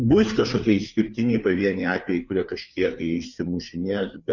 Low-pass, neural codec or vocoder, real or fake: 7.2 kHz; codec, 16 kHz, 4 kbps, FreqCodec, larger model; fake